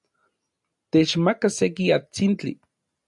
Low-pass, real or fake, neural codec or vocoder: 10.8 kHz; real; none